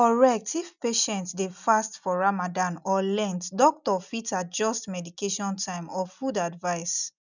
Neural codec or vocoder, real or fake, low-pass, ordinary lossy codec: none; real; 7.2 kHz; none